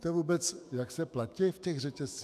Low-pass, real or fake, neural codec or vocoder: 14.4 kHz; fake; autoencoder, 48 kHz, 128 numbers a frame, DAC-VAE, trained on Japanese speech